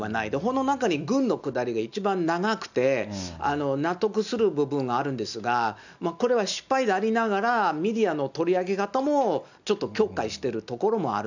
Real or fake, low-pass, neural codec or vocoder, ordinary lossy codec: real; 7.2 kHz; none; none